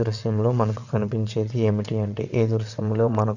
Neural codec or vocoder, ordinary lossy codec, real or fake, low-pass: vocoder, 22.05 kHz, 80 mel bands, WaveNeXt; none; fake; 7.2 kHz